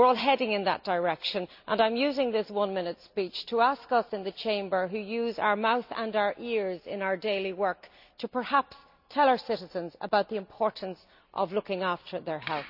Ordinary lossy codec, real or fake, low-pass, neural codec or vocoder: none; real; 5.4 kHz; none